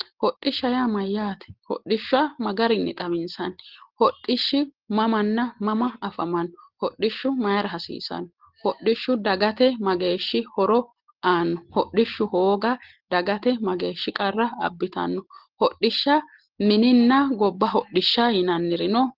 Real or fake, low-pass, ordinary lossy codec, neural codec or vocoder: real; 5.4 kHz; Opus, 16 kbps; none